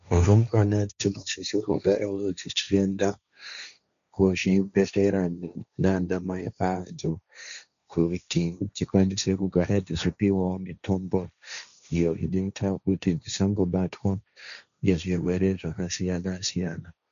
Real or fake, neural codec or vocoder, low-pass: fake; codec, 16 kHz, 1.1 kbps, Voila-Tokenizer; 7.2 kHz